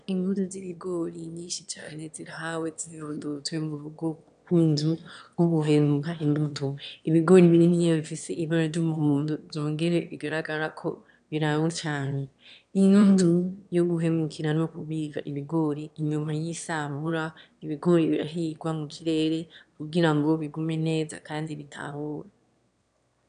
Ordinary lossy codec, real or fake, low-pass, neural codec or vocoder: AAC, 96 kbps; fake; 9.9 kHz; autoencoder, 22.05 kHz, a latent of 192 numbers a frame, VITS, trained on one speaker